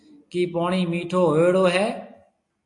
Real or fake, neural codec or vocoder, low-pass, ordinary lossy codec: real; none; 10.8 kHz; AAC, 48 kbps